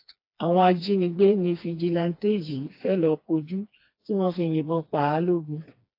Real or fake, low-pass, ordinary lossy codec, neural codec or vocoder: fake; 5.4 kHz; AAC, 32 kbps; codec, 16 kHz, 2 kbps, FreqCodec, smaller model